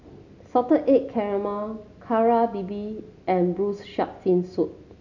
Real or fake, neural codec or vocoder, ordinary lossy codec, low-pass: real; none; none; 7.2 kHz